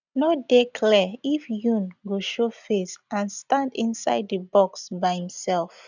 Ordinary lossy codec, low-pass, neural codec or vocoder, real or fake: none; 7.2 kHz; none; real